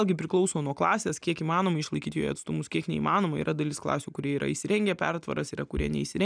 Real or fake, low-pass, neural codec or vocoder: real; 9.9 kHz; none